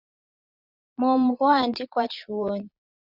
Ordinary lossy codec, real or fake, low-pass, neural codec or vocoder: Opus, 64 kbps; fake; 5.4 kHz; vocoder, 22.05 kHz, 80 mel bands, Vocos